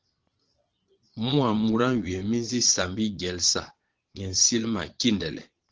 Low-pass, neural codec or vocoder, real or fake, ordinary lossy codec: 7.2 kHz; vocoder, 22.05 kHz, 80 mel bands, Vocos; fake; Opus, 16 kbps